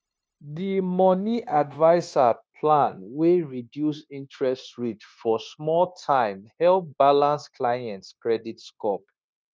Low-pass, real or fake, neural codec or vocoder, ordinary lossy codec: none; fake; codec, 16 kHz, 0.9 kbps, LongCat-Audio-Codec; none